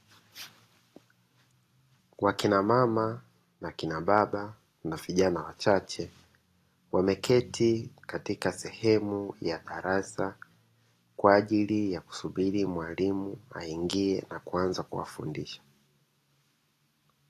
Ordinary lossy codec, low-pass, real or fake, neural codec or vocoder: AAC, 48 kbps; 14.4 kHz; real; none